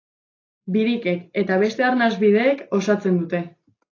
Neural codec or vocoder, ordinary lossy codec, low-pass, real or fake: none; AAC, 32 kbps; 7.2 kHz; real